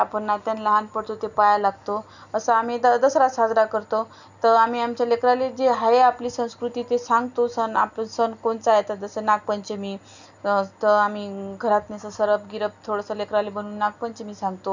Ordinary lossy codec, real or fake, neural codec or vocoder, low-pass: none; real; none; 7.2 kHz